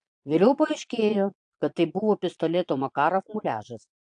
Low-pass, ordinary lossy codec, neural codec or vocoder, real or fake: 9.9 kHz; AAC, 64 kbps; vocoder, 22.05 kHz, 80 mel bands, Vocos; fake